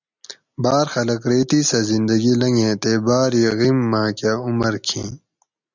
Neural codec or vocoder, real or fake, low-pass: none; real; 7.2 kHz